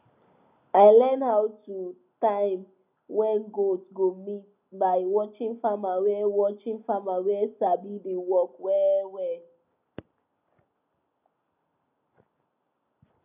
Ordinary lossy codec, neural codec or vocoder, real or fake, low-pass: none; none; real; 3.6 kHz